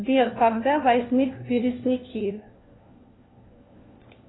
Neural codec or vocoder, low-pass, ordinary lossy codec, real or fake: codec, 16 kHz, 1 kbps, FunCodec, trained on LibriTTS, 50 frames a second; 7.2 kHz; AAC, 16 kbps; fake